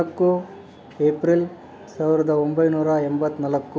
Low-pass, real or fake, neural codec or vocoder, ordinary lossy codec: none; real; none; none